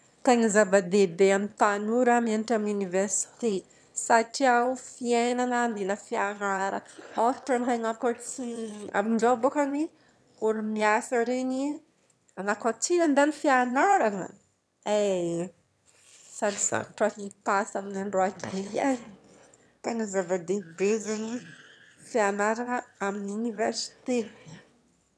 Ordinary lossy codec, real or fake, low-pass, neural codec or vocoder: none; fake; none; autoencoder, 22.05 kHz, a latent of 192 numbers a frame, VITS, trained on one speaker